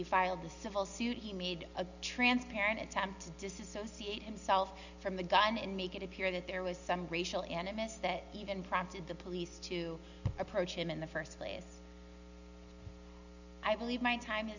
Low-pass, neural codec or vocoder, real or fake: 7.2 kHz; none; real